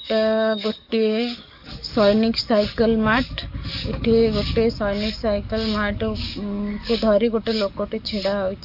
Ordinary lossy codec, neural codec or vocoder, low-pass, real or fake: none; codec, 44.1 kHz, 7.8 kbps, DAC; 5.4 kHz; fake